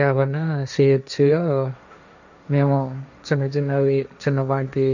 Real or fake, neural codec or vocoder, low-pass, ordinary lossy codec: fake; codec, 16 kHz, 1.1 kbps, Voila-Tokenizer; 7.2 kHz; none